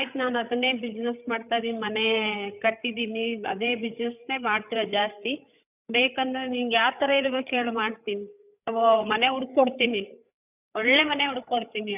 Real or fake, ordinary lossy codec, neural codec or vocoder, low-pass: fake; none; codec, 16 kHz, 8 kbps, FreqCodec, larger model; 3.6 kHz